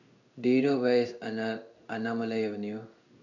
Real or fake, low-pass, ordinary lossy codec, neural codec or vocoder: fake; 7.2 kHz; none; codec, 16 kHz in and 24 kHz out, 1 kbps, XY-Tokenizer